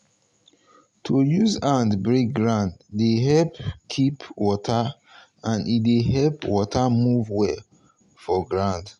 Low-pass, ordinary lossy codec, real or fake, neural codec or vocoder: 10.8 kHz; none; real; none